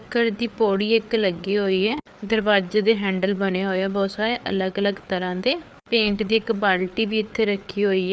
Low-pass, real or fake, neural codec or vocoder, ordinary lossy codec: none; fake; codec, 16 kHz, 4 kbps, FreqCodec, larger model; none